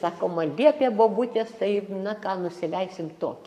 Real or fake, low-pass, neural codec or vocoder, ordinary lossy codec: fake; 14.4 kHz; codec, 44.1 kHz, 7.8 kbps, Pupu-Codec; MP3, 96 kbps